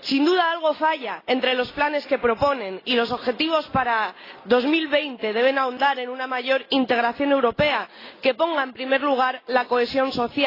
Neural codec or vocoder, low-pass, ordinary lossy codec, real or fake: none; 5.4 kHz; AAC, 24 kbps; real